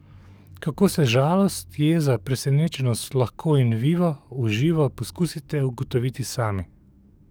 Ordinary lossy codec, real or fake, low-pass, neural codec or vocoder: none; fake; none; codec, 44.1 kHz, 7.8 kbps, DAC